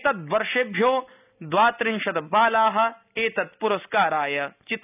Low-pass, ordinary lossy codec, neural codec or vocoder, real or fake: 3.6 kHz; none; none; real